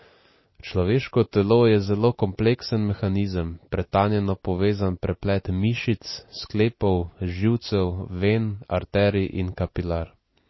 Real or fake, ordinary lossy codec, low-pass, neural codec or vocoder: real; MP3, 24 kbps; 7.2 kHz; none